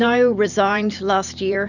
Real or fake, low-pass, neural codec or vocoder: fake; 7.2 kHz; vocoder, 44.1 kHz, 128 mel bands every 512 samples, BigVGAN v2